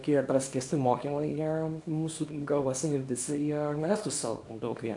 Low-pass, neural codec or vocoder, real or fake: 10.8 kHz; codec, 24 kHz, 0.9 kbps, WavTokenizer, small release; fake